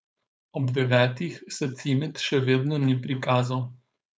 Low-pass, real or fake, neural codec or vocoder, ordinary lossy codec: none; fake; codec, 16 kHz, 4.8 kbps, FACodec; none